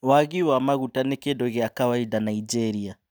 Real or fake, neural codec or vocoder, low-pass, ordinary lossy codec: real; none; none; none